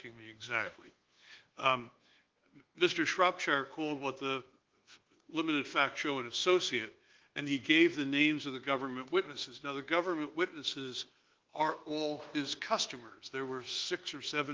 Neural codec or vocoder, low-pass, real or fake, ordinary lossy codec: codec, 24 kHz, 1.2 kbps, DualCodec; 7.2 kHz; fake; Opus, 16 kbps